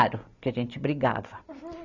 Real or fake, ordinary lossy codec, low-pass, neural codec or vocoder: real; none; 7.2 kHz; none